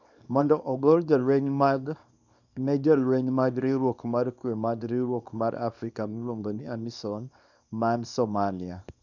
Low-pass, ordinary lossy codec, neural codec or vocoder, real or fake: 7.2 kHz; none; codec, 24 kHz, 0.9 kbps, WavTokenizer, small release; fake